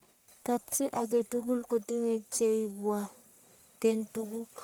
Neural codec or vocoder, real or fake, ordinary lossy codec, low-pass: codec, 44.1 kHz, 1.7 kbps, Pupu-Codec; fake; none; none